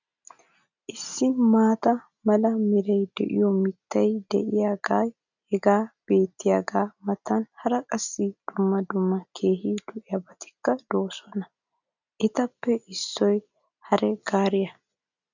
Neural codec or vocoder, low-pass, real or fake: none; 7.2 kHz; real